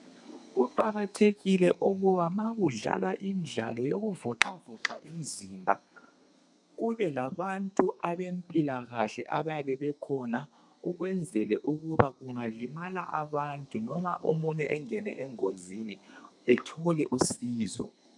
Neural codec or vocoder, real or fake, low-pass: codec, 32 kHz, 1.9 kbps, SNAC; fake; 10.8 kHz